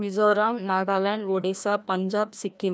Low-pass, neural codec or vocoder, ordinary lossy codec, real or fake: none; codec, 16 kHz, 1 kbps, FreqCodec, larger model; none; fake